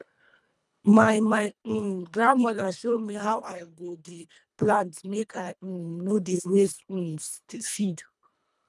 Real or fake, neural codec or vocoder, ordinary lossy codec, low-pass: fake; codec, 24 kHz, 1.5 kbps, HILCodec; none; none